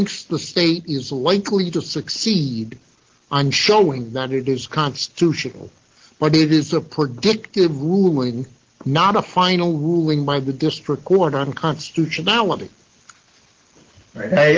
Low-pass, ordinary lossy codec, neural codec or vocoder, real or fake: 7.2 kHz; Opus, 16 kbps; none; real